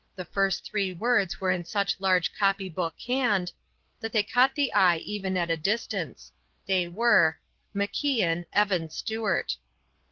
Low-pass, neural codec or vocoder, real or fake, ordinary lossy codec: 7.2 kHz; none; real; Opus, 16 kbps